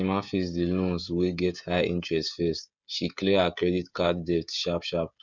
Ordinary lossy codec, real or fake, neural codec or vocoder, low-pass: none; fake; codec, 16 kHz, 16 kbps, FreqCodec, smaller model; 7.2 kHz